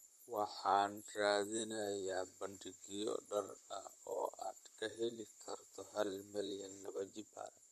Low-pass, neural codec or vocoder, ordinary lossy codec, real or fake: 14.4 kHz; vocoder, 44.1 kHz, 128 mel bands, Pupu-Vocoder; MP3, 64 kbps; fake